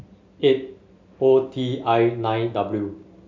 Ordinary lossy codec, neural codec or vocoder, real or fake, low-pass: MP3, 64 kbps; none; real; 7.2 kHz